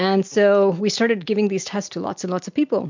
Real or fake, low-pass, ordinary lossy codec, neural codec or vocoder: real; 7.2 kHz; MP3, 64 kbps; none